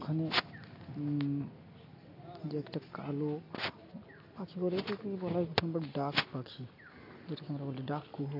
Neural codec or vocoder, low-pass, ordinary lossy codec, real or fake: none; 5.4 kHz; AAC, 32 kbps; real